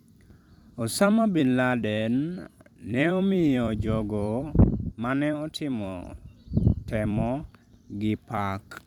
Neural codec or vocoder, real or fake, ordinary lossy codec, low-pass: vocoder, 44.1 kHz, 128 mel bands every 256 samples, BigVGAN v2; fake; none; 19.8 kHz